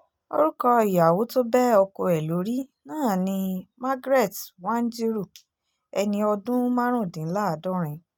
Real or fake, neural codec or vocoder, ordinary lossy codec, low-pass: real; none; none; 19.8 kHz